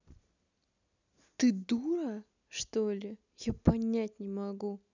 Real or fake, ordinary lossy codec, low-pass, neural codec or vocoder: real; none; 7.2 kHz; none